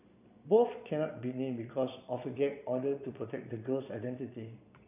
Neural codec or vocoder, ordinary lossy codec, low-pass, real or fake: vocoder, 22.05 kHz, 80 mel bands, Vocos; none; 3.6 kHz; fake